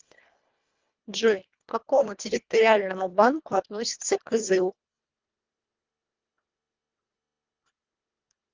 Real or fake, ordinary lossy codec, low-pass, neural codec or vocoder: fake; Opus, 32 kbps; 7.2 kHz; codec, 24 kHz, 1.5 kbps, HILCodec